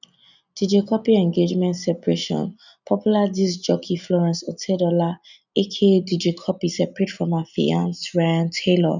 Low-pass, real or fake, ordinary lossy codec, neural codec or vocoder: 7.2 kHz; real; none; none